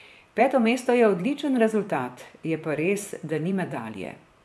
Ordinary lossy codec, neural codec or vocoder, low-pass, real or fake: none; vocoder, 24 kHz, 100 mel bands, Vocos; none; fake